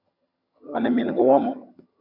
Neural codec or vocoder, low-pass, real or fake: vocoder, 22.05 kHz, 80 mel bands, HiFi-GAN; 5.4 kHz; fake